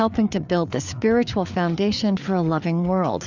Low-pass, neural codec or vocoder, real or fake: 7.2 kHz; codec, 16 kHz, 4 kbps, FreqCodec, larger model; fake